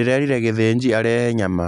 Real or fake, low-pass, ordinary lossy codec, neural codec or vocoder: real; 10.8 kHz; none; none